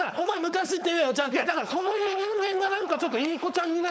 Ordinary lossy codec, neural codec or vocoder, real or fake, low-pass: none; codec, 16 kHz, 4.8 kbps, FACodec; fake; none